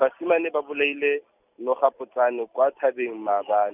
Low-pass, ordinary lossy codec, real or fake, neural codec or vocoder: 3.6 kHz; none; real; none